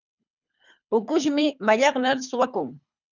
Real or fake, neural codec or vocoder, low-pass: fake; codec, 24 kHz, 3 kbps, HILCodec; 7.2 kHz